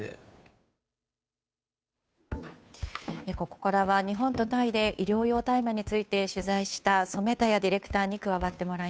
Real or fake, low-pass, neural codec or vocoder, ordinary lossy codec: fake; none; codec, 16 kHz, 2 kbps, FunCodec, trained on Chinese and English, 25 frames a second; none